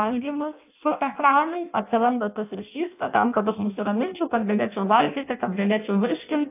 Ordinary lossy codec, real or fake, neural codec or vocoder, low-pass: AAC, 32 kbps; fake; codec, 16 kHz in and 24 kHz out, 0.6 kbps, FireRedTTS-2 codec; 3.6 kHz